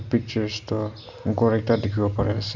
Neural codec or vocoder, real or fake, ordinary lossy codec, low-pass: none; real; none; 7.2 kHz